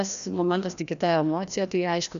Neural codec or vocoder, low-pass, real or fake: codec, 16 kHz, 1 kbps, FreqCodec, larger model; 7.2 kHz; fake